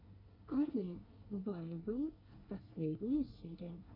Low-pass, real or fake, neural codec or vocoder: 5.4 kHz; fake; codec, 24 kHz, 1 kbps, SNAC